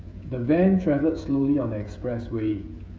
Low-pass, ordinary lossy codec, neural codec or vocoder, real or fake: none; none; codec, 16 kHz, 16 kbps, FreqCodec, smaller model; fake